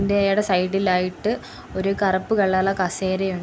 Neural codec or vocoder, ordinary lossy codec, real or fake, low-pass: none; none; real; none